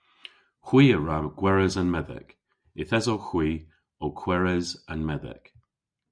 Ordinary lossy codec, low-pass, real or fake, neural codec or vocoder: MP3, 96 kbps; 9.9 kHz; real; none